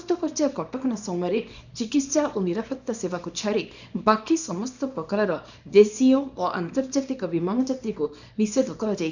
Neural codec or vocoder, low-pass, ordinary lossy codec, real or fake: codec, 24 kHz, 0.9 kbps, WavTokenizer, small release; 7.2 kHz; none; fake